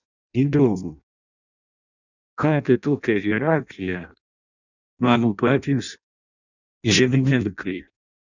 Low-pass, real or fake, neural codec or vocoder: 7.2 kHz; fake; codec, 16 kHz in and 24 kHz out, 0.6 kbps, FireRedTTS-2 codec